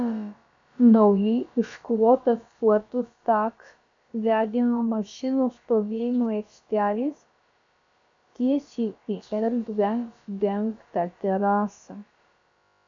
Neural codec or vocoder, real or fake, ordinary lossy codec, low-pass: codec, 16 kHz, about 1 kbps, DyCAST, with the encoder's durations; fake; AAC, 64 kbps; 7.2 kHz